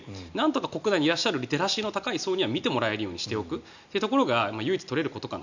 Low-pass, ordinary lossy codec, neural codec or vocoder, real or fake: 7.2 kHz; none; none; real